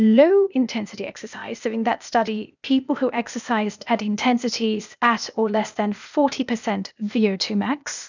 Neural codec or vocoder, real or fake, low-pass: codec, 16 kHz, 0.8 kbps, ZipCodec; fake; 7.2 kHz